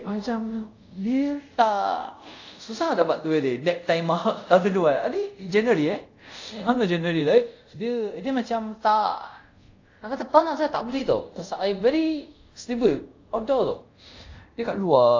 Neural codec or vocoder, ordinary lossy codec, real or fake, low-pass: codec, 24 kHz, 0.5 kbps, DualCodec; AAC, 48 kbps; fake; 7.2 kHz